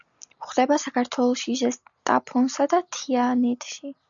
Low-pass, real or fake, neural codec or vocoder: 7.2 kHz; real; none